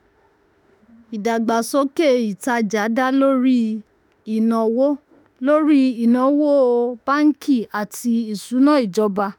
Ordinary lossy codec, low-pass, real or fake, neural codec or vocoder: none; none; fake; autoencoder, 48 kHz, 32 numbers a frame, DAC-VAE, trained on Japanese speech